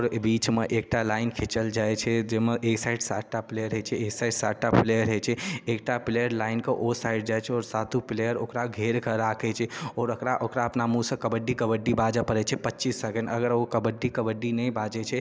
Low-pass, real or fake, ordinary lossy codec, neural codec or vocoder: none; real; none; none